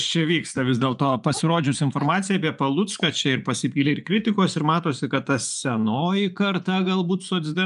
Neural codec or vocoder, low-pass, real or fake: vocoder, 24 kHz, 100 mel bands, Vocos; 10.8 kHz; fake